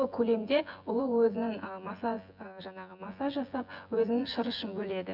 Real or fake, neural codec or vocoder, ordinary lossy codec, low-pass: fake; vocoder, 24 kHz, 100 mel bands, Vocos; none; 5.4 kHz